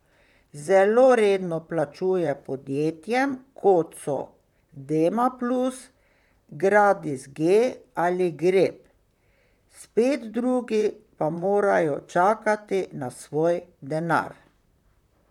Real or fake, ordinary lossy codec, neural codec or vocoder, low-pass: fake; none; vocoder, 44.1 kHz, 128 mel bands, Pupu-Vocoder; 19.8 kHz